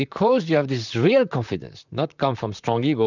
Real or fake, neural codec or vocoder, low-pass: fake; vocoder, 44.1 kHz, 128 mel bands, Pupu-Vocoder; 7.2 kHz